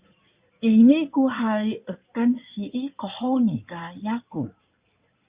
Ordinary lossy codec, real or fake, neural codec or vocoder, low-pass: Opus, 64 kbps; fake; codec, 16 kHz in and 24 kHz out, 2.2 kbps, FireRedTTS-2 codec; 3.6 kHz